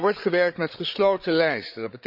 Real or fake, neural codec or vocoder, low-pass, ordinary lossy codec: fake; codec, 16 kHz, 8 kbps, FreqCodec, larger model; 5.4 kHz; none